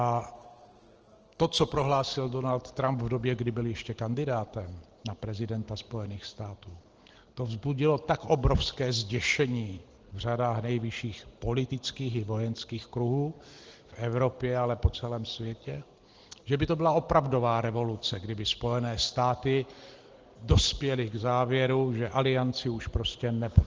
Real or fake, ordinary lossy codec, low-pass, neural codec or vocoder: real; Opus, 16 kbps; 7.2 kHz; none